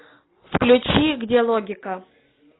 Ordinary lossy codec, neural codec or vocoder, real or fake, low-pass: AAC, 16 kbps; none; real; 7.2 kHz